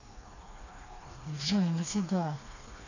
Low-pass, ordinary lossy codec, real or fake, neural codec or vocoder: 7.2 kHz; none; fake; codec, 16 kHz, 2 kbps, FreqCodec, smaller model